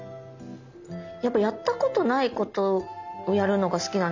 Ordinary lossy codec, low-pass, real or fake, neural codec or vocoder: none; 7.2 kHz; real; none